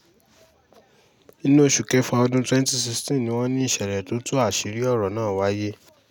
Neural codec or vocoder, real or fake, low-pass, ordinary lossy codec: none; real; 19.8 kHz; none